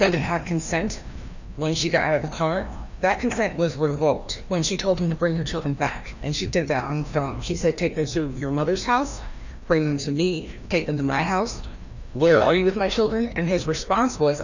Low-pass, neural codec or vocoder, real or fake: 7.2 kHz; codec, 16 kHz, 1 kbps, FreqCodec, larger model; fake